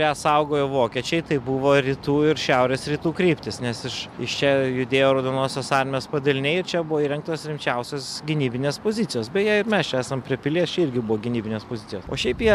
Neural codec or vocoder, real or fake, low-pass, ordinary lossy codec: none; real; 14.4 kHz; MP3, 96 kbps